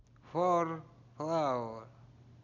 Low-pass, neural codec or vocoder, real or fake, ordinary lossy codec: 7.2 kHz; none; real; none